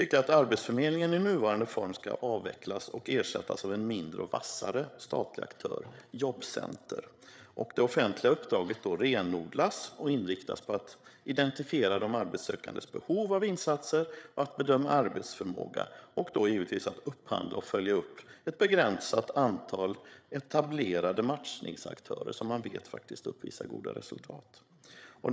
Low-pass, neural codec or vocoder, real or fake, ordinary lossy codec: none; codec, 16 kHz, 8 kbps, FreqCodec, larger model; fake; none